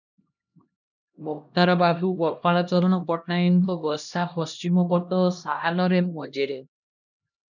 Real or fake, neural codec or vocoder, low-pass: fake; codec, 16 kHz, 1 kbps, X-Codec, HuBERT features, trained on LibriSpeech; 7.2 kHz